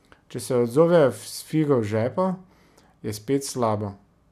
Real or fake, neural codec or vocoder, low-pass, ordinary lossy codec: real; none; 14.4 kHz; none